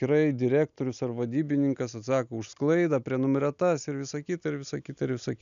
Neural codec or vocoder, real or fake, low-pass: none; real; 7.2 kHz